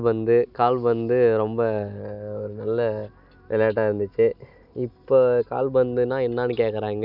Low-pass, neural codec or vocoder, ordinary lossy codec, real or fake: 5.4 kHz; none; none; real